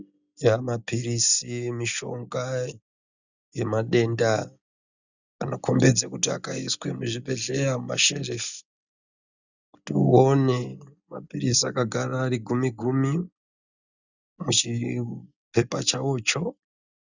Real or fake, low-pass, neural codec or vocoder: real; 7.2 kHz; none